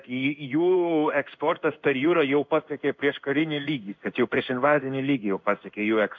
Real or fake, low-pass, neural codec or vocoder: fake; 7.2 kHz; codec, 16 kHz in and 24 kHz out, 1 kbps, XY-Tokenizer